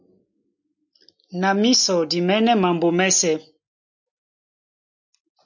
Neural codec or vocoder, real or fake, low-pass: none; real; 7.2 kHz